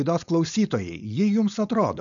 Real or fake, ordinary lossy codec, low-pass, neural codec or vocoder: fake; MP3, 96 kbps; 7.2 kHz; codec, 16 kHz, 4.8 kbps, FACodec